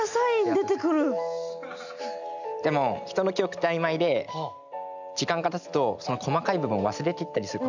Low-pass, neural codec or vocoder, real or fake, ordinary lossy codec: 7.2 kHz; none; real; none